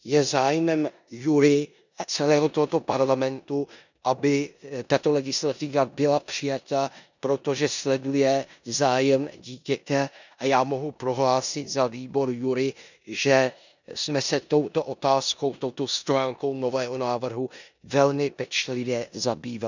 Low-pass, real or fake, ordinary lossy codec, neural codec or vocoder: 7.2 kHz; fake; none; codec, 16 kHz in and 24 kHz out, 0.9 kbps, LongCat-Audio-Codec, four codebook decoder